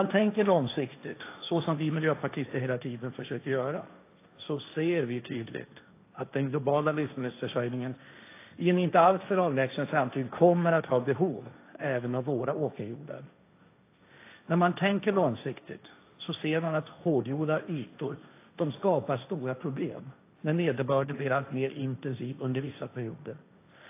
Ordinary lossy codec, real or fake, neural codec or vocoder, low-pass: AAC, 24 kbps; fake; codec, 16 kHz, 1.1 kbps, Voila-Tokenizer; 3.6 kHz